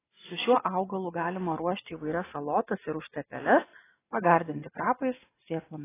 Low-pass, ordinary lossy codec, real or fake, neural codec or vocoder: 3.6 kHz; AAC, 16 kbps; real; none